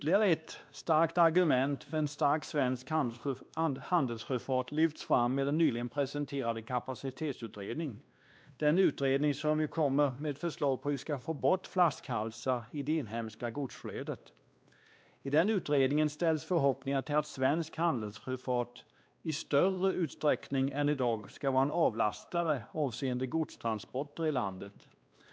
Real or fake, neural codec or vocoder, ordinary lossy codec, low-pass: fake; codec, 16 kHz, 2 kbps, X-Codec, WavLM features, trained on Multilingual LibriSpeech; none; none